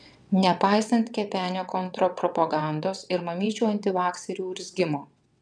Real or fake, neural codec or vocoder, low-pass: fake; vocoder, 22.05 kHz, 80 mel bands, WaveNeXt; 9.9 kHz